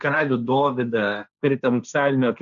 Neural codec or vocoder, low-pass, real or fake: codec, 16 kHz, 1.1 kbps, Voila-Tokenizer; 7.2 kHz; fake